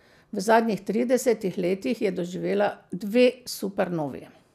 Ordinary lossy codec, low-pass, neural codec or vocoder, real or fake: none; 14.4 kHz; none; real